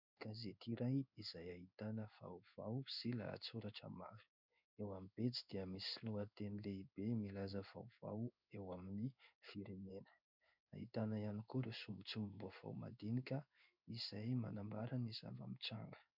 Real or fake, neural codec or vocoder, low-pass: fake; codec, 16 kHz in and 24 kHz out, 1 kbps, XY-Tokenizer; 5.4 kHz